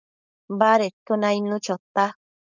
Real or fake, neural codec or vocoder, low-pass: fake; codec, 16 kHz, 4.8 kbps, FACodec; 7.2 kHz